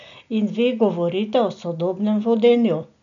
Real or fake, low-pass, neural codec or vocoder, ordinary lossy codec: real; 7.2 kHz; none; MP3, 96 kbps